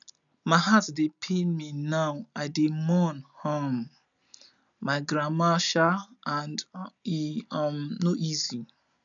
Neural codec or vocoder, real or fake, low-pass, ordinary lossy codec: none; real; 7.2 kHz; none